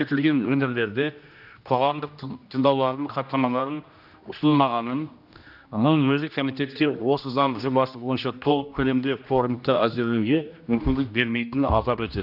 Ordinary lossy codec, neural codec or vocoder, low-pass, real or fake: none; codec, 16 kHz, 1 kbps, X-Codec, HuBERT features, trained on general audio; 5.4 kHz; fake